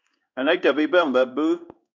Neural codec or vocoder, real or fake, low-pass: codec, 16 kHz in and 24 kHz out, 1 kbps, XY-Tokenizer; fake; 7.2 kHz